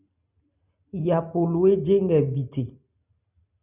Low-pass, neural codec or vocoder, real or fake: 3.6 kHz; none; real